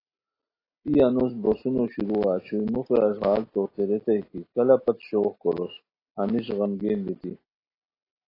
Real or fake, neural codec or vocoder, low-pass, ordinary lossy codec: real; none; 5.4 kHz; AAC, 24 kbps